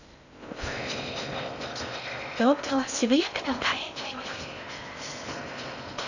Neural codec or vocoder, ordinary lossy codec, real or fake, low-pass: codec, 16 kHz in and 24 kHz out, 0.6 kbps, FocalCodec, streaming, 4096 codes; none; fake; 7.2 kHz